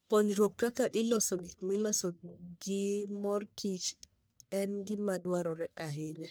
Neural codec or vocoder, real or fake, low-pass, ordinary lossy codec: codec, 44.1 kHz, 1.7 kbps, Pupu-Codec; fake; none; none